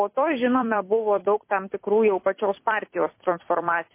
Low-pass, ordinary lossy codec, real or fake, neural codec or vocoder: 3.6 kHz; MP3, 24 kbps; fake; vocoder, 44.1 kHz, 128 mel bands every 256 samples, BigVGAN v2